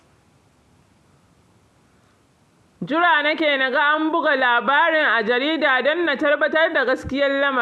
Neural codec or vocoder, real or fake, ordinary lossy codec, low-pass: none; real; none; none